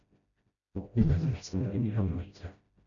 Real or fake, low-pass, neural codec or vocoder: fake; 7.2 kHz; codec, 16 kHz, 0.5 kbps, FreqCodec, smaller model